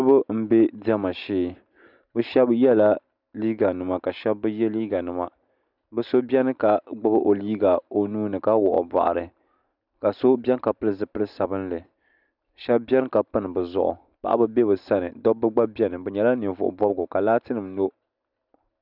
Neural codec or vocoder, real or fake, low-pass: none; real; 5.4 kHz